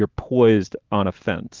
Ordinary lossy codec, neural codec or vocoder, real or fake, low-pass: Opus, 32 kbps; none; real; 7.2 kHz